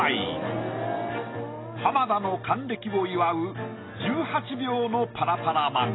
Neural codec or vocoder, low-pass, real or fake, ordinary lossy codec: none; 7.2 kHz; real; AAC, 16 kbps